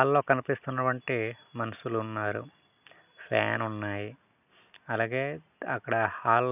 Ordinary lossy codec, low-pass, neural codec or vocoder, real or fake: none; 3.6 kHz; none; real